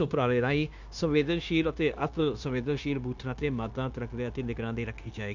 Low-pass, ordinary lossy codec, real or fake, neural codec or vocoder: 7.2 kHz; none; fake; codec, 16 kHz, 0.9 kbps, LongCat-Audio-Codec